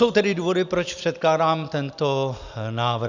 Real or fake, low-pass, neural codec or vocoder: real; 7.2 kHz; none